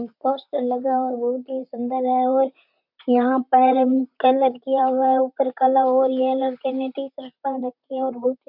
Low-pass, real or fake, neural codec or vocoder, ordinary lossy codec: 5.4 kHz; fake; vocoder, 44.1 kHz, 128 mel bands, Pupu-Vocoder; none